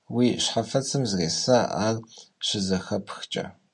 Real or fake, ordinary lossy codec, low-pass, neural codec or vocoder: real; MP3, 48 kbps; 10.8 kHz; none